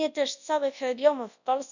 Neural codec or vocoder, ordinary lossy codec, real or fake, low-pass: codec, 24 kHz, 0.9 kbps, WavTokenizer, large speech release; none; fake; 7.2 kHz